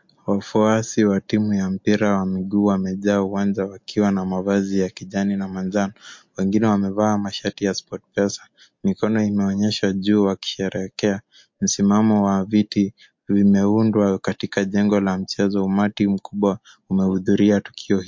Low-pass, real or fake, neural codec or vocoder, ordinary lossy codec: 7.2 kHz; real; none; MP3, 48 kbps